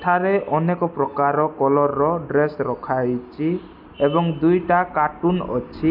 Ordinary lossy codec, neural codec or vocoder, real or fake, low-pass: AAC, 48 kbps; none; real; 5.4 kHz